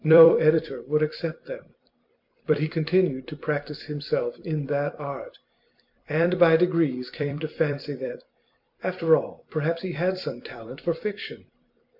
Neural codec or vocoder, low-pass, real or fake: vocoder, 44.1 kHz, 128 mel bands every 256 samples, BigVGAN v2; 5.4 kHz; fake